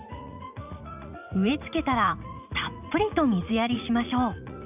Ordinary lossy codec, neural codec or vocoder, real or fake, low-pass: none; vocoder, 44.1 kHz, 80 mel bands, Vocos; fake; 3.6 kHz